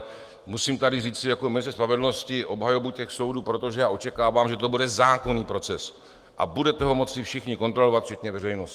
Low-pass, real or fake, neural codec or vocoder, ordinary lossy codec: 14.4 kHz; fake; autoencoder, 48 kHz, 128 numbers a frame, DAC-VAE, trained on Japanese speech; Opus, 24 kbps